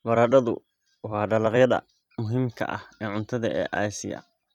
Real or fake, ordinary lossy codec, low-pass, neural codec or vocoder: fake; none; 19.8 kHz; vocoder, 44.1 kHz, 128 mel bands every 256 samples, BigVGAN v2